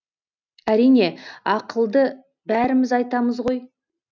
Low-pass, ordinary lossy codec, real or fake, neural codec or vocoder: 7.2 kHz; none; real; none